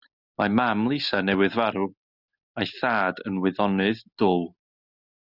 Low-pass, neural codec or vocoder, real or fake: 5.4 kHz; none; real